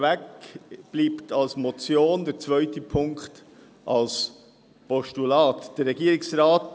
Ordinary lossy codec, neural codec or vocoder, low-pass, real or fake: none; none; none; real